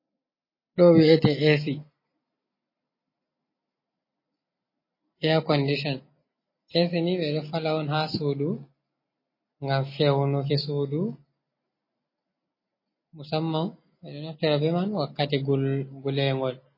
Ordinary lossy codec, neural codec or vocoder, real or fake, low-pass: MP3, 24 kbps; none; real; 5.4 kHz